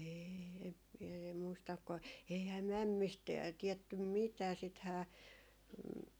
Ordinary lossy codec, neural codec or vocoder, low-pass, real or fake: none; none; none; real